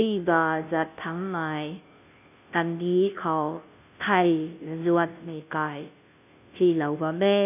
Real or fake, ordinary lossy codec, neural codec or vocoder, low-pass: fake; none; codec, 16 kHz, 0.5 kbps, FunCodec, trained on Chinese and English, 25 frames a second; 3.6 kHz